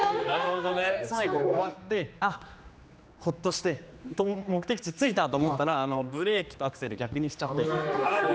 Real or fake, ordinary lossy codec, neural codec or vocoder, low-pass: fake; none; codec, 16 kHz, 2 kbps, X-Codec, HuBERT features, trained on general audio; none